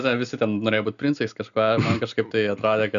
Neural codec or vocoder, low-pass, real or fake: none; 7.2 kHz; real